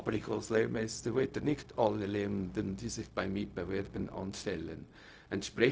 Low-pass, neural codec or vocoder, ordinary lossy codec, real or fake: none; codec, 16 kHz, 0.4 kbps, LongCat-Audio-Codec; none; fake